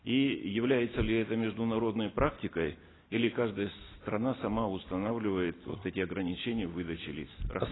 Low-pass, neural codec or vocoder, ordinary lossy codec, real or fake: 7.2 kHz; none; AAC, 16 kbps; real